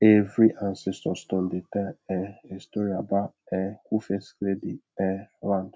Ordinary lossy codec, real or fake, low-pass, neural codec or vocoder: none; real; none; none